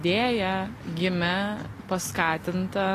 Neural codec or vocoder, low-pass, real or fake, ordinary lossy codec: none; 14.4 kHz; real; AAC, 48 kbps